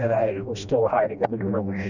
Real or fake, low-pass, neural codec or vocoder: fake; 7.2 kHz; codec, 16 kHz, 1 kbps, FreqCodec, smaller model